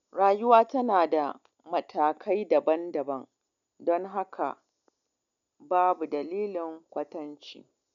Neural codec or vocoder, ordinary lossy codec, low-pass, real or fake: none; none; 7.2 kHz; real